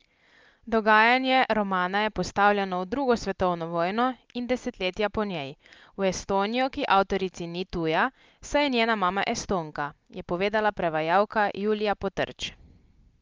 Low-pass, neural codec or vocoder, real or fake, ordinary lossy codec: 7.2 kHz; none; real; Opus, 24 kbps